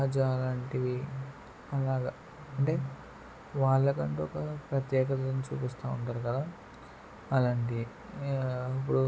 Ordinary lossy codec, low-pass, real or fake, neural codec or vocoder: none; none; real; none